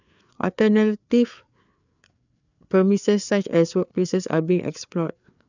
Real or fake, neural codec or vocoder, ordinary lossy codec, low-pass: fake; codec, 16 kHz, 4 kbps, FreqCodec, larger model; none; 7.2 kHz